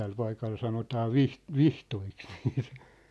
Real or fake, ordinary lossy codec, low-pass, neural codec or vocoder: real; none; none; none